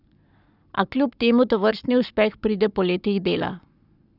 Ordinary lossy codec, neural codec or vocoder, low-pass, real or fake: none; codec, 44.1 kHz, 7.8 kbps, Pupu-Codec; 5.4 kHz; fake